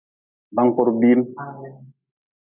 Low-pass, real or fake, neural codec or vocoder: 3.6 kHz; real; none